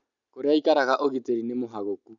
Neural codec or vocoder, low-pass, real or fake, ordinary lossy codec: none; 7.2 kHz; real; none